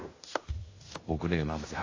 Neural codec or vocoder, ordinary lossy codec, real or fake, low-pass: codec, 16 kHz in and 24 kHz out, 0.9 kbps, LongCat-Audio-Codec, fine tuned four codebook decoder; AAC, 32 kbps; fake; 7.2 kHz